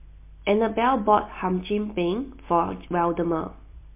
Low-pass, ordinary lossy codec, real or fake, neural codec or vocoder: 3.6 kHz; MP3, 24 kbps; real; none